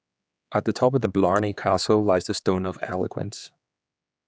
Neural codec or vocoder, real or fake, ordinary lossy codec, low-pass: codec, 16 kHz, 4 kbps, X-Codec, HuBERT features, trained on general audio; fake; none; none